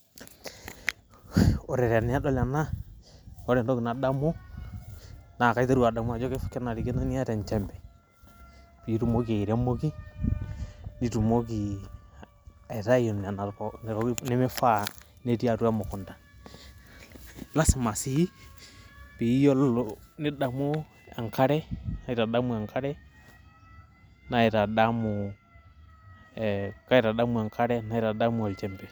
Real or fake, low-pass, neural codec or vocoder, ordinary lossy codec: real; none; none; none